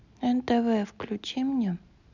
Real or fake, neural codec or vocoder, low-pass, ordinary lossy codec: real; none; 7.2 kHz; none